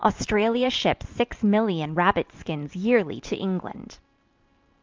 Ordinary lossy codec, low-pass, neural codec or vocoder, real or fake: Opus, 24 kbps; 7.2 kHz; vocoder, 44.1 kHz, 128 mel bands every 512 samples, BigVGAN v2; fake